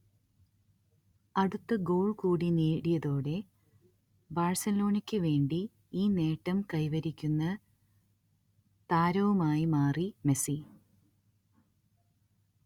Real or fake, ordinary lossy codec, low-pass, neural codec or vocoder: real; Opus, 64 kbps; 19.8 kHz; none